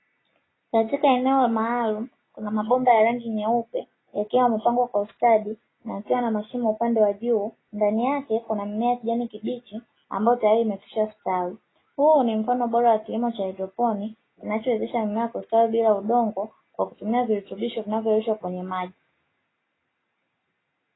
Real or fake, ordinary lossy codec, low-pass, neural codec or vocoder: real; AAC, 16 kbps; 7.2 kHz; none